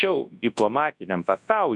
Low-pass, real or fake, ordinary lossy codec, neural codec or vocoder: 10.8 kHz; fake; MP3, 64 kbps; codec, 24 kHz, 0.9 kbps, WavTokenizer, large speech release